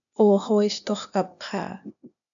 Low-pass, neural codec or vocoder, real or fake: 7.2 kHz; codec, 16 kHz, 0.8 kbps, ZipCodec; fake